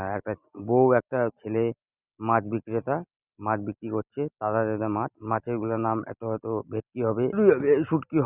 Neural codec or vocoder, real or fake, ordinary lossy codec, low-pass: none; real; Opus, 64 kbps; 3.6 kHz